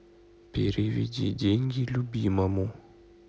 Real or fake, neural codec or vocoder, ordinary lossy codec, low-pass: real; none; none; none